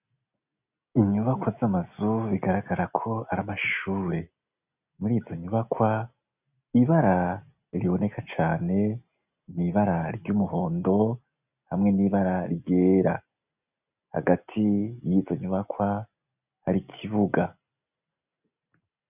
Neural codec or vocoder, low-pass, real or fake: none; 3.6 kHz; real